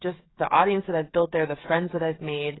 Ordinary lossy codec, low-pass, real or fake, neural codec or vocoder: AAC, 16 kbps; 7.2 kHz; fake; codec, 16 kHz, 8 kbps, FreqCodec, smaller model